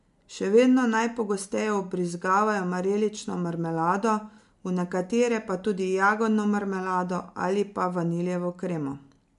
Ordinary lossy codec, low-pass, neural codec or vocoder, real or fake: MP3, 64 kbps; 10.8 kHz; none; real